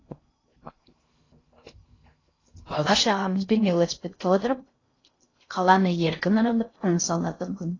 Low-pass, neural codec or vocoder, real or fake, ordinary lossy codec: 7.2 kHz; codec, 16 kHz in and 24 kHz out, 0.6 kbps, FocalCodec, streaming, 2048 codes; fake; AAC, 32 kbps